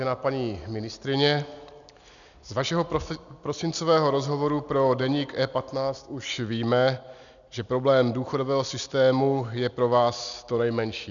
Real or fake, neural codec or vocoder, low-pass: real; none; 7.2 kHz